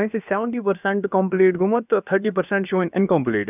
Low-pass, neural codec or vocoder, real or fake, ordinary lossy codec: 3.6 kHz; codec, 16 kHz, about 1 kbps, DyCAST, with the encoder's durations; fake; none